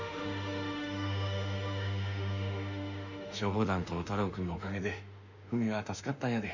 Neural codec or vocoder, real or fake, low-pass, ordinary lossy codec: autoencoder, 48 kHz, 32 numbers a frame, DAC-VAE, trained on Japanese speech; fake; 7.2 kHz; none